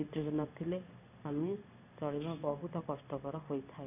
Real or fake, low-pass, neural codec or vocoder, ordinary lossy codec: fake; 3.6 kHz; codec, 16 kHz in and 24 kHz out, 1 kbps, XY-Tokenizer; none